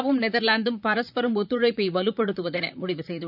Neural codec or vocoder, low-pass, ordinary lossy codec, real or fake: vocoder, 44.1 kHz, 128 mel bands, Pupu-Vocoder; 5.4 kHz; none; fake